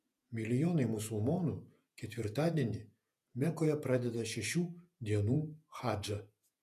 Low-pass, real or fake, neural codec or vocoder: 14.4 kHz; fake; vocoder, 48 kHz, 128 mel bands, Vocos